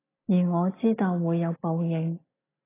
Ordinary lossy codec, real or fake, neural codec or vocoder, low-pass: AAC, 16 kbps; real; none; 3.6 kHz